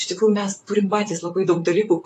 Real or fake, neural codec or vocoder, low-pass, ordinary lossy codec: fake; vocoder, 44.1 kHz, 128 mel bands, Pupu-Vocoder; 14.4 kHz; AAC, 48 kbps